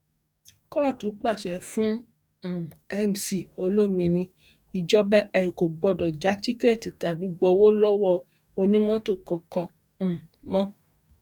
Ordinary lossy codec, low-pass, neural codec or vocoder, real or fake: none; 19.8 kHz; codec, 44.1 kHz, 2.6 kbps, DAC; fake